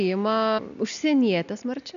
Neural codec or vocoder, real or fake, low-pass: none; real; 7.2 kHz